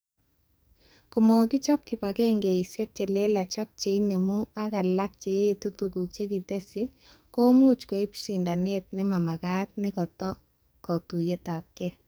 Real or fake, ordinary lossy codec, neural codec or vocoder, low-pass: fake; none; codec, 44.1 kHz, 2.6 kbps, SNAC; none